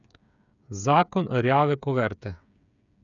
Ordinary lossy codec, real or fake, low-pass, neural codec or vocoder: none; fake; 7.2 kHz; codec, 16 kHz, 8 kbps, FreqCodec, smaller model